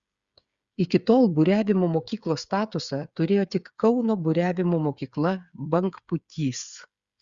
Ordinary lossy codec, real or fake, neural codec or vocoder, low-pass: Opus, 64 kbps; fake; codec, 16 kHz, 8 kbps, FreqCodec, smaller model; 7.2 kHz